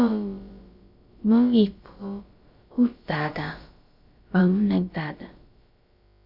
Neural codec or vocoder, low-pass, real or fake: codec, 16 kHz, about 1 kbps, DyCAST, with the encoder's durations; 5.4 kHz; fake